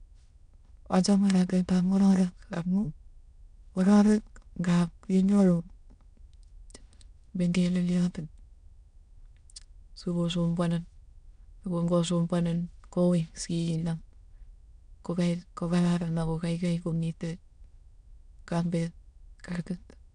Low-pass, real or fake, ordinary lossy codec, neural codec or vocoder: 9.9 kHz; fake; none; autoencoder, 22.05 kHz, a latent of 192 numbers a frame, VITS, trained on many speakers